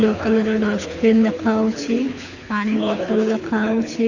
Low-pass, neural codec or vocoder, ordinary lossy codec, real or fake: 7.2 kHz; codec, 24 kHz, 6 kbps, HILCodec; none; fake